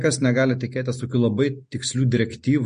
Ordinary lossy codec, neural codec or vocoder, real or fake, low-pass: MP3, 48 kbps; none; real; 9.9 kHz